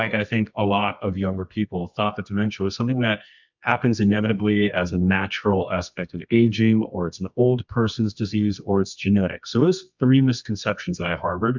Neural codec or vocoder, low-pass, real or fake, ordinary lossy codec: codec, 24 kHz, 0.9 kbps, WavTokenizer, medium music audio release; 7.2 kHz; fake; MP3, 64 kbps